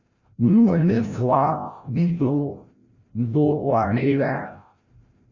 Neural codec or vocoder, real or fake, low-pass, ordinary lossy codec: codec, 16 kHz, 0.5 kbps, FreqCodec, larger model; fake; 7.2 kHz; Opus, 64 kbps